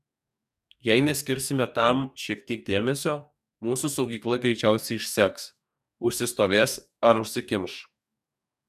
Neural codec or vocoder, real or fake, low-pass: codec, 44.1 kHz, 2.6 kbps, DAC; fake; 14.4 kHz